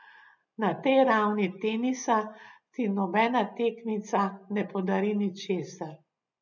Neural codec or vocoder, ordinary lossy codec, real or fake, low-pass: none; none; real; 7.2 kHz